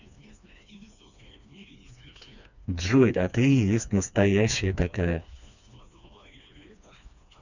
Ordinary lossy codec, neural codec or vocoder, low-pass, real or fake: none; codec, 16 kHz, 2 kbps, FreqCodec, smaller model; 7.2 kHz; fake